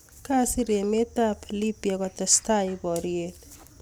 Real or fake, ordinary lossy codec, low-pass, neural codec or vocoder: real; none; none; none